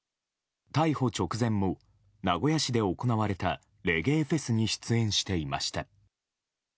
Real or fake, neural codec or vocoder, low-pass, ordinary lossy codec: real; none; none; none